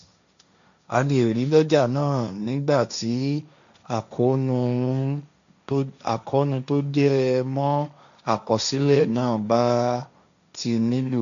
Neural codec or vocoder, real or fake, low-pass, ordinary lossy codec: codec, 16 kHz, 1.1 kbps, Voila-Tokenizer; fake; 7.2 kHz; AAC, 96 kbps